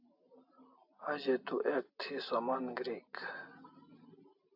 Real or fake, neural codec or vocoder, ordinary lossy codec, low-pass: real; none; AAC, 48 kbps; 5.4 kHz